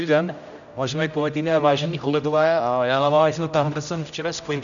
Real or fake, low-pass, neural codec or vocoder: fake; 7.2 kHz; codec, 16 kHz, 0.5 kbps, X-Codec, HuBERT features, trained on general audio